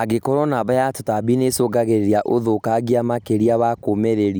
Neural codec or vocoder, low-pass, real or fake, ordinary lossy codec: none; none; real; none